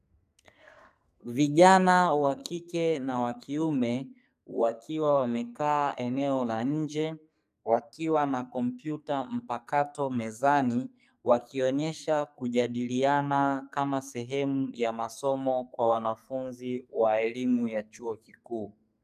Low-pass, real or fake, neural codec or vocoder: 14.4 kHz; fake; codec, 44.1 kHz, 2.6 kbps, SNAC